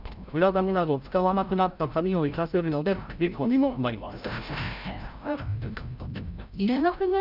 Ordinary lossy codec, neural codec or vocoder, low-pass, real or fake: Opus, 64 kbps; codec, 16 kHz, 0.5 kbps, FreqCodec, larger model; 5.4 kHz; fake